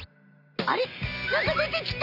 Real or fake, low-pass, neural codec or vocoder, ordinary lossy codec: real; 5.4 kHz; none; none